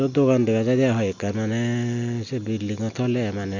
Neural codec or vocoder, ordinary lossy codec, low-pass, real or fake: none; none; 7.2 kHz; real